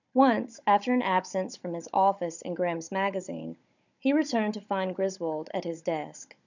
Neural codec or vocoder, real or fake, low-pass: codec, 16 kHz, 16 kbps, FunCodec, trained on Chinese and English, 50 frames a second; fake; 7.2 kHz